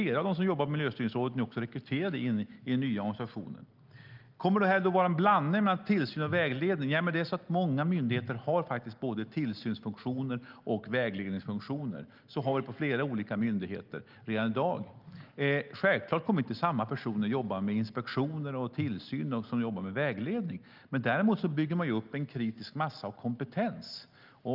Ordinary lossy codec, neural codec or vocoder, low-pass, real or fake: Opus, 32 kbps; none; 5.4 kHz; real